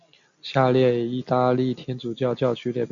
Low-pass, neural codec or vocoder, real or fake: 7.2 kHz; none; real